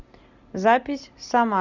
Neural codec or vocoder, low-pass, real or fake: none; 7.2 kHz; real